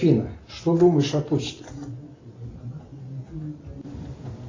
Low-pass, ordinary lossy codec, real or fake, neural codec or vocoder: 7.2 kHz; AAC, 32 kbps; real; none